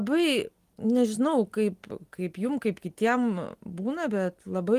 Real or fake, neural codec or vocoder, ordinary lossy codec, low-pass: real; none; Opus, 24 kbps; 14.4 kHz